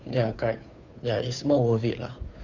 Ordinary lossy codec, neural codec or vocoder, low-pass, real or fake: none; codec, 16 kHz, 2 kbps, FunCodec, trained on Chinese and English, 25 frames a second; 7.2 kHz; fake